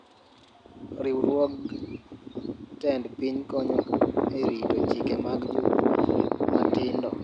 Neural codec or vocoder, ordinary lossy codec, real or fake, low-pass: none; none; real; 9.9 kHz